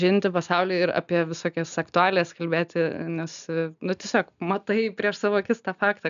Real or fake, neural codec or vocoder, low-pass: real; none; 7.2 kHz